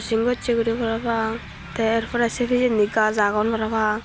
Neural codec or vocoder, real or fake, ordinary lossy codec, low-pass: none; real; none; none